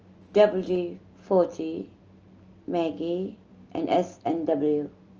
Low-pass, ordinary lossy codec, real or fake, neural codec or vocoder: 7.2 kHz; Opus, 24 kbps; real; none